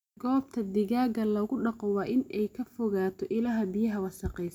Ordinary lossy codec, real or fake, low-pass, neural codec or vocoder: none; real; 19.8 kHz; none